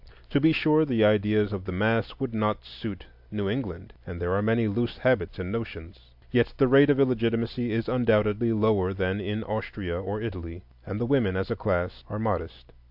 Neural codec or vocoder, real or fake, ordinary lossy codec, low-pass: none; real; AAC, 48 kbps; 5.4 kHz